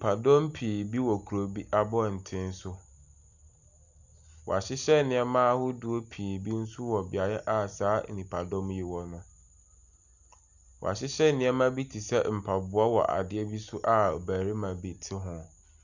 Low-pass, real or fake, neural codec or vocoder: 7.2 kHz; real; none